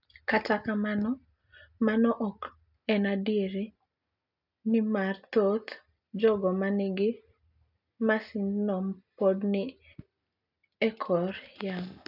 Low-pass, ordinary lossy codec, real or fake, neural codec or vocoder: 5.4 kHz; none; real; none